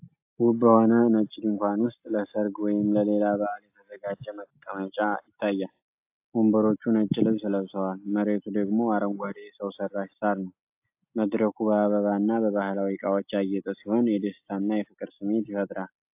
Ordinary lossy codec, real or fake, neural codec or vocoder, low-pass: AAC, 32 kbps; real; none; 3.6 kHz